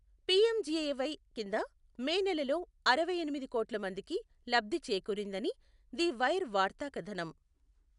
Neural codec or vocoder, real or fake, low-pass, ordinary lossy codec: none; real; 10.8 kHz; none